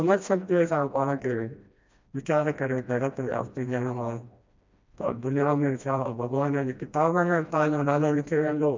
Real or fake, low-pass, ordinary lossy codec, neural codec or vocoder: fake; 7.2 kHz; MP3, 64 kbps; codec, 16 kHz, 1 kbps, FreqCodec, smaller model